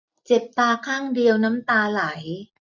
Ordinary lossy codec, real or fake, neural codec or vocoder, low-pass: none; real; none; 7.2 kHz